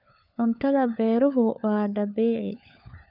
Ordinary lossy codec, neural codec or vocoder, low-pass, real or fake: none; codec, 16 kHz, 4 kbps, FunCodec, trained on LibriTTS, 50 frames a second; 5.4 kHz; fake